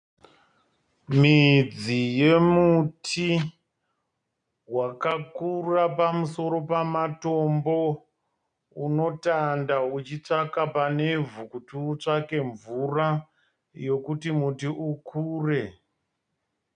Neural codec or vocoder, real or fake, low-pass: none; real; 9.9 kHz